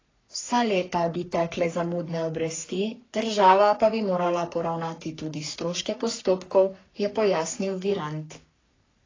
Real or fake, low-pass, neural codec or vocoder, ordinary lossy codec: fake; 7.2 kHz; codec, 44.1 kHz, 3.4 kbps, Pupu-Codec; AAC, 32 kbps